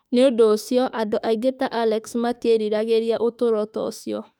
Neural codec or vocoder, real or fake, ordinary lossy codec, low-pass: autoencoder, 48 kHz, 32 numbers a frame, DAC-VAE, trained on Japanese speech; fake; none; 19.8 kHz